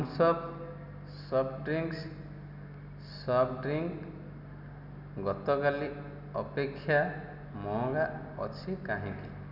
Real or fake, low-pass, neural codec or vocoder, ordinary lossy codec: real; 5.4 kHz; none; none